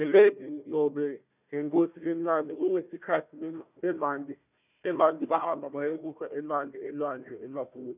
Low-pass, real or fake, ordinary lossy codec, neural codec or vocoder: 3.6 kHz; fake; none; codec, 16 kHz, 1 kbps, FunCodec, trained on Chinese and English, 50 frames a second